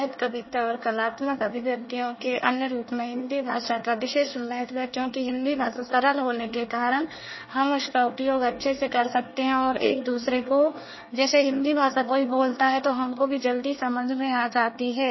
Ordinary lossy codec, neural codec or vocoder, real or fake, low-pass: MP3, 24 kbps; codec, 24 kHz, 1 kbps, SNAC; fake; 7.2 kHz